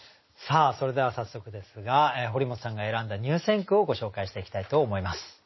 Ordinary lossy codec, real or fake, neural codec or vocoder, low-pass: MP3, 24 kbps; real; none; 7.2 kHz